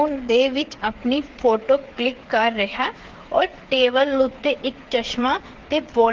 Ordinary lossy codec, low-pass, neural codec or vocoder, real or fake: Opus, 16 kbps; 7.2 kHz; codec, 16 kHz, 8 kbps, FreqCodec, smaller model; fake